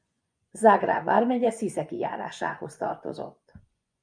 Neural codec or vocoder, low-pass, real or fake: vocoder, 24 kHz, 100 mel bands, Vocos; 9.9 kHz; fake